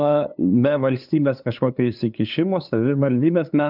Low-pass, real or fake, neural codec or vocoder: 5.4 kHz; fake; codec, 16 kHz, 2 kbps, FunCodec, trained on LibriTTS, 25 frames a second